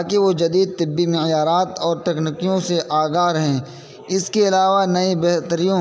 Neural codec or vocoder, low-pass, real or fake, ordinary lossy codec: none; none; real; none